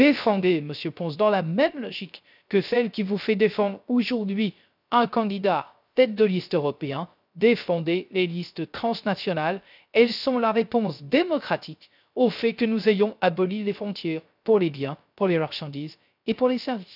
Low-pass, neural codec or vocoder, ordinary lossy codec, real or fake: 5.4 kHz; codec, 16 kHz, 0.3 kbps, FocalCodec; none; fake